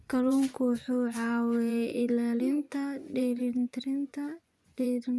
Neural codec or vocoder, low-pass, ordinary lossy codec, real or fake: vocoder, 24 kHz, 100 mel bands, Vocos; none; none; fake